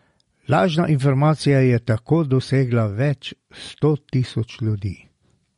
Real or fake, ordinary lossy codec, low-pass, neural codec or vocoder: real; MP3, 48 kbps; 10.8 kHz; none